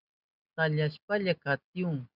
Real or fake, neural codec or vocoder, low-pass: real; none; 5.4 kHz